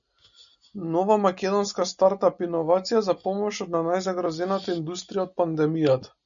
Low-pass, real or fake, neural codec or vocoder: 7.2 kHz; real; none